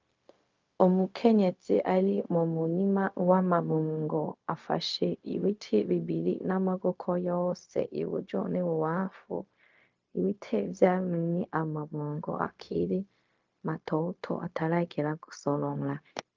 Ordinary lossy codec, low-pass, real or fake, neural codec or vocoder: Opus, 24 kbps; 7.2 kHz; fake; codec, 16 kHz, 0.4 kbps, LongCat-Audio-Codec